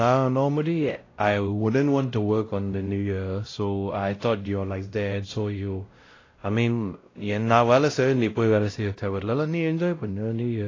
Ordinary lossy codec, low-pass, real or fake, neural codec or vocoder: AAC, 32 kbps; 7.2 kHz; fake; codec, 16 kHz, 0.5 kbps, X-Codec, WavLM features, trained on Multilingual LibriSpeech